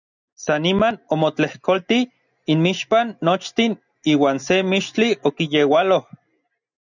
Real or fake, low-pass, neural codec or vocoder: real; 7.2 kHz; none